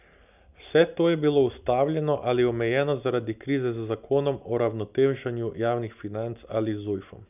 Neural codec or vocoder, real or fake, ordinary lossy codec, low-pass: none; real; none; 3.6 kHz